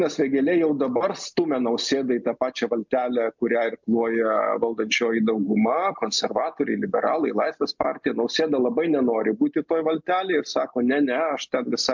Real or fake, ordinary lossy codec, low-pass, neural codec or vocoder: real; MP3, 64 kbps; 7.2 kHz; none